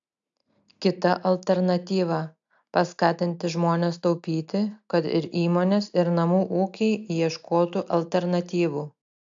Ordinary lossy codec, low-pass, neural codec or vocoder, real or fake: AAC, 64 kbps; 7.2 kHz; none; real